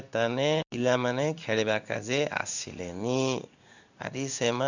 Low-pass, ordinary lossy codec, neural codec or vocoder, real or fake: 7.2 kHz; none; codec, 16 kHz in and 24 kHz out, 1 kbps, XY-Tokenizer; fake